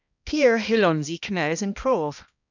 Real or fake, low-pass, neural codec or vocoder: fake; 7.2 kHz; codec, 16 kHz, 1 kbps, X-Codec, HuBERT features, trained on balanced general audio